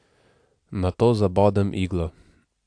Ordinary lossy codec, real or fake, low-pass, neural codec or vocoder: AAC, 64 kbps; real; 9.9 kHz; none